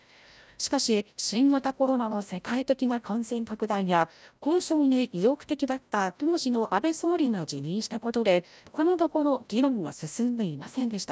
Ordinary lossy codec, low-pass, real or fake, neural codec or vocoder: none; none; fake; codec, 16 kHz, 0.5 kbps, FreqCodec, larger model